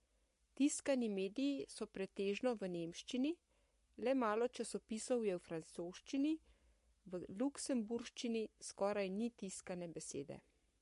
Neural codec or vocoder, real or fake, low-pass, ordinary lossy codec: codec, 44.1 kHz, 7.8 kbps, Pupu-Codec; fake; 14.4 kHz; MP3, 48 kbps